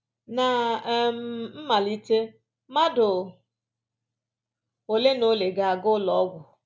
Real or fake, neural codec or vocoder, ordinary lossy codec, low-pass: real; none; none; none